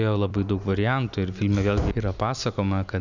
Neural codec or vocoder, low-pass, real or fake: none; 7.2 kHz; real